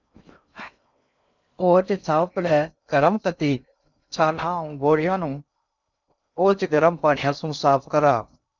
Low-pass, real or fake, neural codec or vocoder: 7.2 kHz; fake; codec, 16 kHz in and 24 kHz out, 0.6 kbps, FocalCodec, streaming, 2048 codes